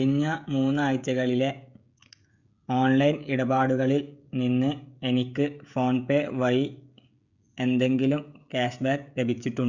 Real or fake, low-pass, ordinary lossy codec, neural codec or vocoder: fake; 7.2 kHz; none; codec, 16 kHz, 16 kbps, FreqCodec, smaller model